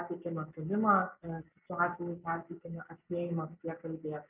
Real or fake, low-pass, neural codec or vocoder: real; 3.6 kHz; none